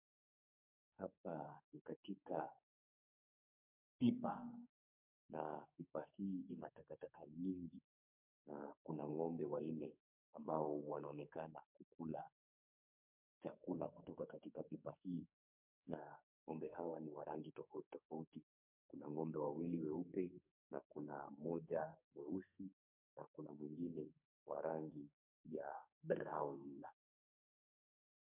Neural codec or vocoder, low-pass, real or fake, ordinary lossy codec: codec, 44.1 kHz, 2.6 kbps, SNAC; 3.6 kHz; fake; MP3, 32 kbps